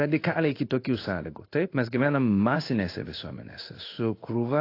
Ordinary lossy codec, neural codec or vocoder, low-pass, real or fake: AAC, 32 kbps; codec, 16 kHz in and 24 kHz out, 1 kbps, XY-Tokenizer; 5.4 kHz; fake